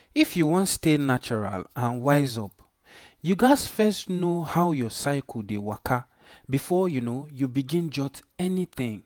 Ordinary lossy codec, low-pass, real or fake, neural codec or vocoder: none; none; fake; vocoder, 48 kHz, 128 mel bands, Vocos